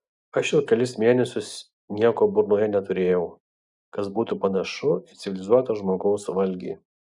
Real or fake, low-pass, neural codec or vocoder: real; 10.8 kHz; none